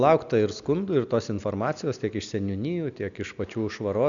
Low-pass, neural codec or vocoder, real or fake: 7.2 kHz; none; real